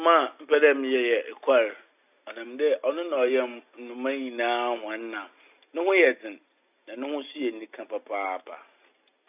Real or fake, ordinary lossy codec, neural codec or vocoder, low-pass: real; none; none; 3.6 kHz